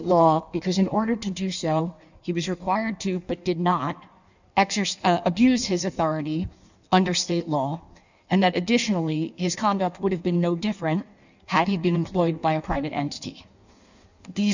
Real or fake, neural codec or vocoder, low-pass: fake; codec, 16 kHz in and 24 kHz out, 1.1 kbps, FireRedTTS-2 codec; 7.2 kHz